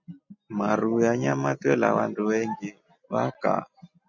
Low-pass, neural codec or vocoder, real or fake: 7.2 kHz; none; real